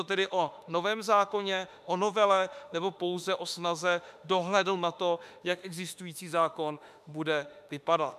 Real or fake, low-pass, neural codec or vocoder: fake; 14.4 kHz; autoencoder, 48 kHz, 32 numbers a frame, DAC-VAE, trained on Japanese speech